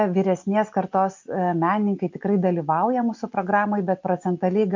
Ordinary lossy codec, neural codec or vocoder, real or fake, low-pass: MP3, 48 kbps; none; real; 7.2 kHz